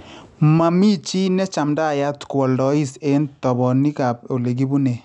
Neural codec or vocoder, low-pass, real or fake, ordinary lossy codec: none; 10.8 kHz; real; none